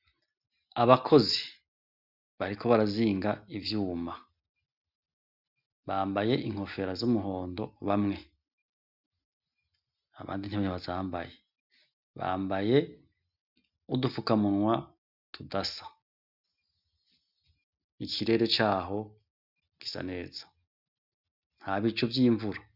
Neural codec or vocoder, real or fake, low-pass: none; real; 5.4 kHz